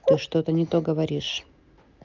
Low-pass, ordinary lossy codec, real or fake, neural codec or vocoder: 7.2 kHz; Opus, 32 kbps; real; none